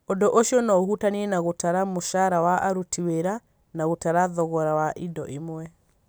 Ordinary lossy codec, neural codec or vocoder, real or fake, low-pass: none; none; real; none